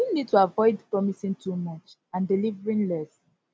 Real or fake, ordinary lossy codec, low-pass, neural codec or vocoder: real; none; none; none